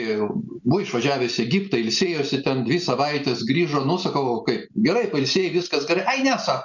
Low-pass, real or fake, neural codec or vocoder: 7.2 kHz; real; none